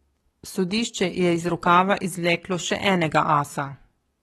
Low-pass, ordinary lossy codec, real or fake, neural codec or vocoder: 19.8 kHz; AAC, 32 kbps; fake; codec, 44.1 kHz, 7.8 kbps, DAC